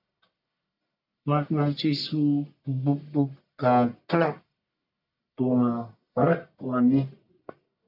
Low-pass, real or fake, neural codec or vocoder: 5.4 kHz; fake; codec, 44.1 kHz, 1.7 kbps, Pupu-Codec